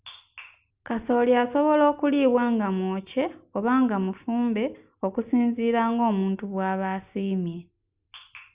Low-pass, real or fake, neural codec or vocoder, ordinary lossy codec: 3.6 kHz; real; none; Opus, 64 kbps